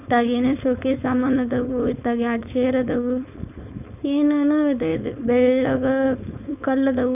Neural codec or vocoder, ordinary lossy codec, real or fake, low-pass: codec, 16 kHz, 4.8 kbps, FACodec; none; fake; 3.6 kHz